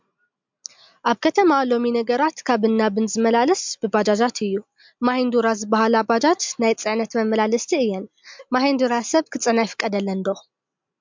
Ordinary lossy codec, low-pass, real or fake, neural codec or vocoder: MP3, 64 kbps; 7.2 kHz; real; none